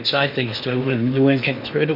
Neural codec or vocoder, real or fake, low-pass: codec, 16 kHz in and 24 kHz out, 0.8 kbps, FocalCodec, streaming, 65536 codes; fake; 5.4 kHz